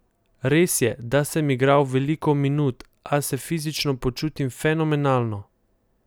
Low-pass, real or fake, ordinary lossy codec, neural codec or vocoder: none; real; none; none